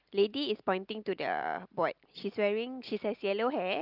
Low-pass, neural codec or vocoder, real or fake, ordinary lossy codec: 5.4 kHz; none; real; Opus, 32 kbps